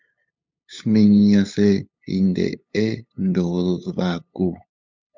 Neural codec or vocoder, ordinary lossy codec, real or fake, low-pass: codec, 16 kHz, 8 kbps, FunCodec, trained on LibriTTS, 25 frames a second; MP3, 64 kbps; fake; 7.2 kHz